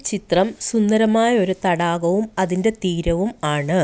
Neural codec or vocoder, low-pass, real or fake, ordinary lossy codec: none; none; real; none